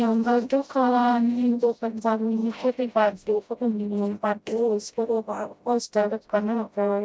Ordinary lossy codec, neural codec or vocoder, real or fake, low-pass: none; codec, 16 kHz, 0.5 kbps, FreqCodec, smaller model; fake; none